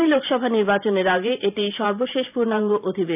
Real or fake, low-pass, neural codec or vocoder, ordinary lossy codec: fake; 3.6 kHz; vocoder, 44.1 kHz, 128 mel bands every 512 samples, BigVGAN v2; none